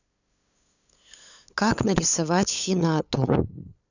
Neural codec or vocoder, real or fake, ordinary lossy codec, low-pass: codec, 16 kHz, 8 kbps, FunCodec, trained on LibriTTS, 25 frames a second; fake; none; 7.2 kHz